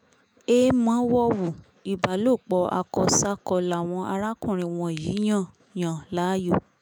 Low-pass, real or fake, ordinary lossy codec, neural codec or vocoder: none; fake; none; autoencoder, 48 kHz, 128 numbers a frame, DAC-VAE, trained on Japanese speech